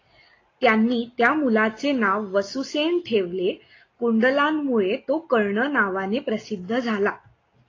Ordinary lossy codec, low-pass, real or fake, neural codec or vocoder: AAC, 32 kbps; 7.2 kHz; real; none